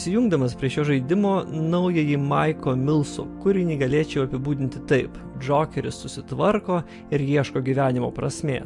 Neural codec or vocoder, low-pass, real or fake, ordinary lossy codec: none; 10.8 kHz; real; MP3, 64 kbps